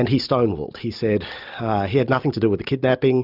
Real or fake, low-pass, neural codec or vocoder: real; 5.4 kHz; none